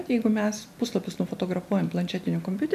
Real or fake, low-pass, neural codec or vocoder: real; 14.4 kHz; none